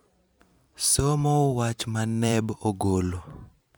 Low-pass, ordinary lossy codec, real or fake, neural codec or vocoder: none; none; fake; vocoder, 44.1 kHz, 128 mel bands every 512 samples, BigVGAN v2